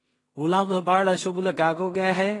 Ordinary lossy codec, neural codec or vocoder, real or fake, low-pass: AAC, 32 kbps; codec, 16 kHz in and 24 kHz out, 0.4 kbps, LongCat-Audio-Codec, two codebook decoder; fake; 10.8 kHz